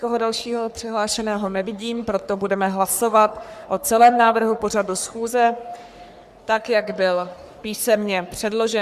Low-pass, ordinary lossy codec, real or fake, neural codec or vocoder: 14.4 kHz; Opus, 64 kbps; fake; codec, 44.1 kHz, 3.4 kbps, Pupu-Codec